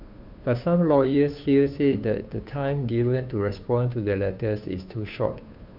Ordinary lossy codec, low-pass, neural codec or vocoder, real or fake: none; 5.4 kHz; codec, 16 kHz, 2 kbps, FunCodec, trained on Chinese and English, 25 frames a second; fake